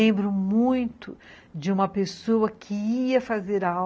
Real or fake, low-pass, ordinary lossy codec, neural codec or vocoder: real; none; none; none